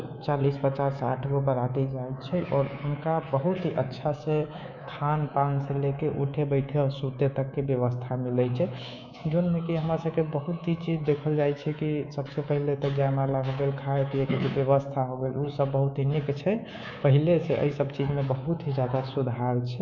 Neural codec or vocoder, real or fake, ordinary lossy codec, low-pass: codec, 24 kHz, 3.1 kbps, DualCodec; fake; none; 7.2 kHz